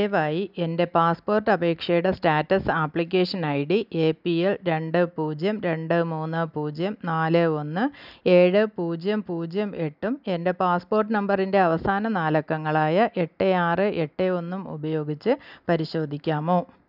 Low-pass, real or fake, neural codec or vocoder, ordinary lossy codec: 5.4 kHz; real; none; none